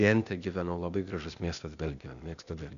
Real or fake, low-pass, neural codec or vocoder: fake; 7.2 kHz; codec, 16 kHz, 0.8 kbps, ZipCodec